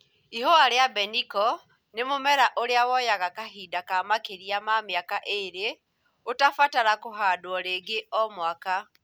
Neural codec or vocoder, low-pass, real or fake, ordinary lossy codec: none; none; real; none